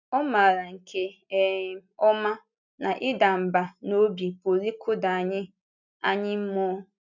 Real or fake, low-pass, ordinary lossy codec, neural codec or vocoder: real; 7.2 kHz; none; none